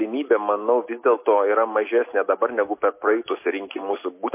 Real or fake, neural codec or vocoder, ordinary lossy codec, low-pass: real; none; MP3, 24 kbps; 3.6 kHz